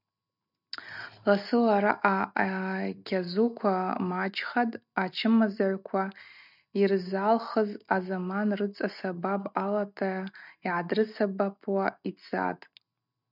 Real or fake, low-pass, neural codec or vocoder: real; 5.4 kHz; none